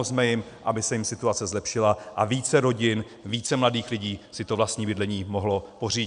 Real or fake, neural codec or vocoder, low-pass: real; none; 9.9 kHz